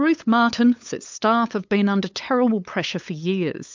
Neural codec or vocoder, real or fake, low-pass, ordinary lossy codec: codec, 16 kHz, 8 kbps, FunCodec, trained on LibriTTS, 25 frames a second; fake; 7.2 kHz; MP3, 64 kbps